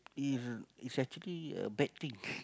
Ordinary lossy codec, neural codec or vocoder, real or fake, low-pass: none; none; real; none